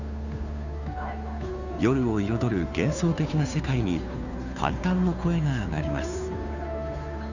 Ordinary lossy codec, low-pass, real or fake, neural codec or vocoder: none; 7.2 kHz; fake; codec, 16 kHz, 2 kbps, FunCodec, trained on Chinese and English, 25 frames a second